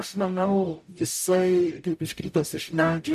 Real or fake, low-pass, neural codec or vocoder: fake; 14.4 kHz; codec, 44.1 kHz, 0.9 kbps, DAC